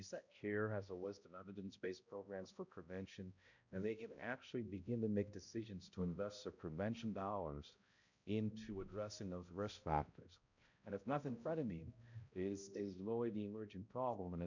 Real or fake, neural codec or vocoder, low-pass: fake; codec, 16 kHz, 0.5 kbps, X-Codec, HuBERT features, trained on balanced general audio; 7.2 kHz